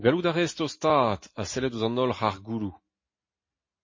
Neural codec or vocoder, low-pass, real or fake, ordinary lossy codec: none; 7.2 kHz; real; MP3, 32 kbps